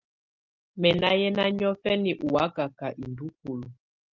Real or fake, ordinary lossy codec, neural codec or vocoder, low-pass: real; Opus, 32 kbps; none; 7.2 kHz